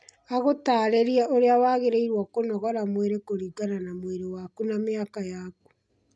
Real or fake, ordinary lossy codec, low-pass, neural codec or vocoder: real; none; none; none